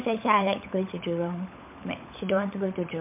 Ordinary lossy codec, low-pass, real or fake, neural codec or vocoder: MP3, 32 kbps; 3.6 kHz; fake; codec, 16 kHz, 16 kbps, FunCodec, trained on LibriTTS, 50 frames a second